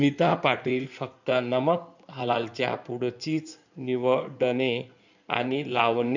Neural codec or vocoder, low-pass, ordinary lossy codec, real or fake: codec, 16 kHz in and 24 kHz out, 2.2 kbps, FireRedTTS-2 codec; 7.2 kHz; none; fake